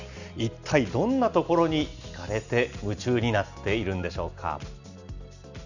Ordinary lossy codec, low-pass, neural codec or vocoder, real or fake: none; 7.2 kHz; none; real